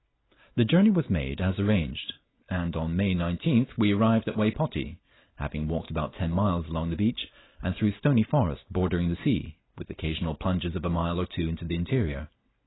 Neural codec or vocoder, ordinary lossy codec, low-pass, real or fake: none; AAC, 16 kbps; 7.2 kHz; real